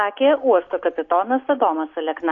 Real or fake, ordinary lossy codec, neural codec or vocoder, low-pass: real; AAC, 48 kbps; none; 7.2 kHz